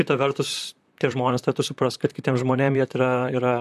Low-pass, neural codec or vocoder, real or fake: 14.4 kHz; vocoder, 44.1 kHz, 128 mel bands, Pupu-Vocoder; fake